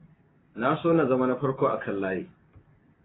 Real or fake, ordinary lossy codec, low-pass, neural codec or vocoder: real; AAC, 16 kbps; 7.2 kHz; none